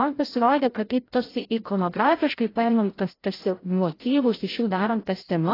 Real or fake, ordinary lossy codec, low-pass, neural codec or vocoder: fake; AAC, 24 kbps; 5.4 kHz; codec, 16 kHz, 0.5 kbps, FreqCodec, larger model